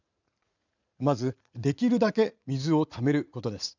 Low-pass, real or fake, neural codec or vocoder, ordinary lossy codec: 7.2 kHz; fake; vocoder, 44.1 kHz, 80 mel bands, Vocos; none